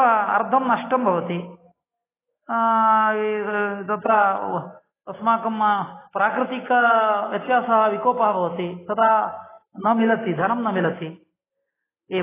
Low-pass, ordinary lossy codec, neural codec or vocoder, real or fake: 3.6 kHz; AAC, 16 kbps; none; real